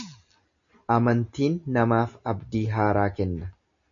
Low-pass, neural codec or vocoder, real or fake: 7.2 kHz; none; real